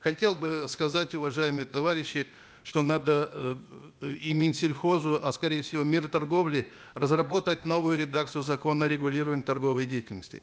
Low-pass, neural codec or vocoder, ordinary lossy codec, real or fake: none; codec, 16 kHz, 0.8 kbps, ZipCodec; none; fake